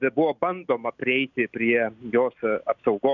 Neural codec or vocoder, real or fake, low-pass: none; real; 7.2 kHz